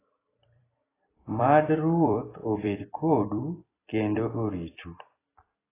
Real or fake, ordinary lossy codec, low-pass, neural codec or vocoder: fake; AAC, 16 kbps; 3.6 kHz; vocoder, 44.1 kHz, 128 mel bands every 256 samples, BigVGAN v2